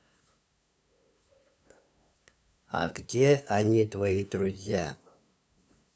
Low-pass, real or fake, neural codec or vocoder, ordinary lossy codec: none; fake; codec, 16 kHz, 2 kbps, FunCodec, trained on LibriTTS, 25 frames a second; none